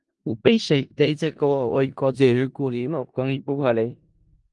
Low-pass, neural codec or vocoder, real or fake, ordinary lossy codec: 10.8 kHz; codec, 16 kHz in and 24 kHz out, 0.4 kbps, LongCat-Audio-Codec, four codebook decoder; fake; Opus, 32 kbps